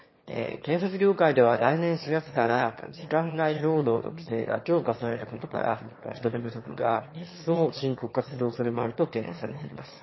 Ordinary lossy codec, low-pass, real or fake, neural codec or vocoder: MP3, 24 kbps; 7.2 kHz; fake; autoencoder, 22.05 kHz, a latent of 192 numbers a frame, VITS, trained on one speaker